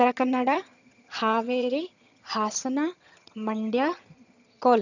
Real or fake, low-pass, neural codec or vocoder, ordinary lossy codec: fake; 7.2 kHz; vocoder, 22.05 kHz, 80 mel bands, HiFi-GAN; none